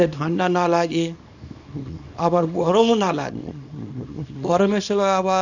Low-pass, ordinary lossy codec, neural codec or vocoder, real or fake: 7.2 kHz; none; codec, 24 kHz, 0.9 kbps, WavTokenizer, small release; fake